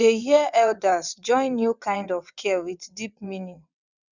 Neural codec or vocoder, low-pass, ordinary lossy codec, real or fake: vocoder, 22.05 kHz, 80 mel bands, WaveNeXt; 7.2 kHz; none; fake